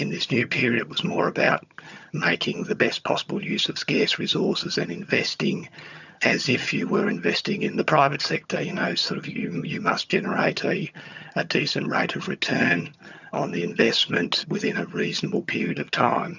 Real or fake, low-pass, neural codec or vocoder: fake; 7.2 kHz; vocoder, 22.05 kHz, 80 mel bands, HiFi-GAN